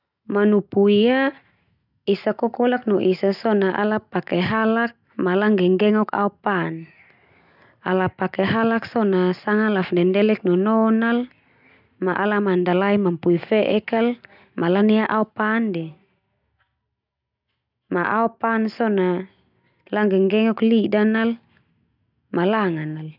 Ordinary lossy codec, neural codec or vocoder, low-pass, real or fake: none; none; 5.4 kHz; real